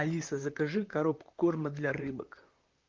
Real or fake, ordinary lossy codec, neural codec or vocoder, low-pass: fake; Opus, 32 kbps; vocoder, 44.1 kHz, 128 mel bands, Pupu-Vocoder; 7.2 kHz